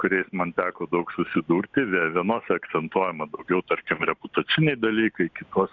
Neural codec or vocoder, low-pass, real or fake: none; 7.2 kHz; real